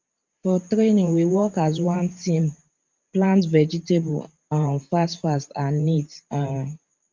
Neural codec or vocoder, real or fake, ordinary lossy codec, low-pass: vocoder, 44.1 kHz, 128 mel bands every 512 samples, BigVGAN v2; fake; Opus, 24 kbps; 7.2 kHz